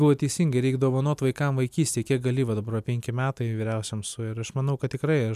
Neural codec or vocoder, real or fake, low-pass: none; real; 14.4 kHz